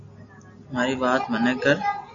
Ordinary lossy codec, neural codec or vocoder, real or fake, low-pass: AAC, 64 kbps; none; real; 7.2 kHz